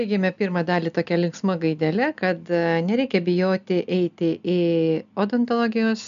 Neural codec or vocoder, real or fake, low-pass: none; real; 7.2 kHz